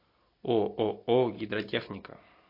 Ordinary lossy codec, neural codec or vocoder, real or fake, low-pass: MP3, 32 kbps; none; real; 5.4 kHz